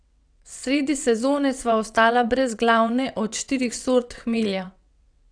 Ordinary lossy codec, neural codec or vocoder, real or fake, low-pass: none; vocoder, 22.05 kHz, 80 mel bands, WaveNeXt; fake; 9.9 kHz